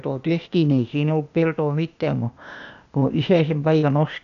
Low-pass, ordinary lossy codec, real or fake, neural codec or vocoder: 7.2 kHz; none; fake; codec, 16 kHz, 0.8 kbps, ZipCodec